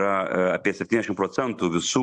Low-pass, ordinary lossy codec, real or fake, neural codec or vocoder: 10.8 kHz; MP3, 64 kbps; real; none